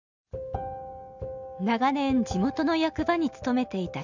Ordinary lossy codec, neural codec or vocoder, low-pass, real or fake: none; none; 7.2 kHz; real